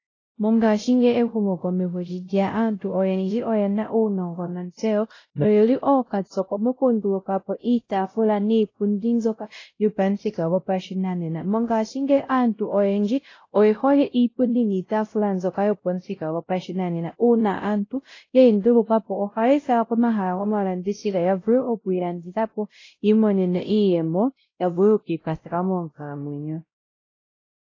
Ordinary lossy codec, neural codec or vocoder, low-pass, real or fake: AAC, 32 kbps; codec, 16 kHz, 0.5 kbps, X-Codec, WavLM features, trained on Multilingual LibriSpeech; 7.2 kHz; fake